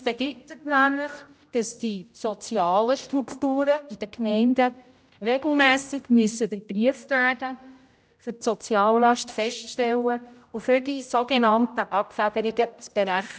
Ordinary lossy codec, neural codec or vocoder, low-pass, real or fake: none; codec, 16 kHz, 0.5 kbps, X-Codec, HuBERT features, trained on general audio; none; fake